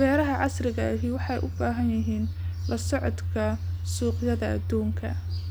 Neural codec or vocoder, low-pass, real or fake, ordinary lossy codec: none; none; real; none